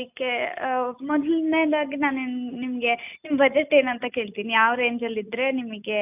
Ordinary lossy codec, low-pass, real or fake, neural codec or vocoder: none; 3.6 kHz; real; none